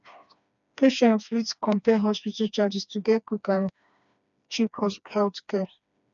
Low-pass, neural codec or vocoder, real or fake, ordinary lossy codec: 7.2 kHz; codec, 16 kHz, 2 kbps, FreqCodec, smaller model; fake; none